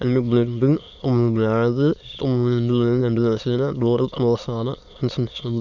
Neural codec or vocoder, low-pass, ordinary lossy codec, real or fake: autoencoder, 22.05 kHz, a latent of 192 numbers a frame, VITS, trained on many speakers; 7.2 kHz; none; fake